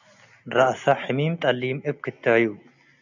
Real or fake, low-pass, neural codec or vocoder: real; 7.2 kHz; none